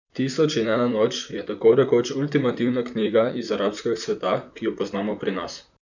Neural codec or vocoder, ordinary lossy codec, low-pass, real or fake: vocoder, 44.1 kHz, 128 mel bands, Pupu-Vocoder; none; 7.2 kHz; fake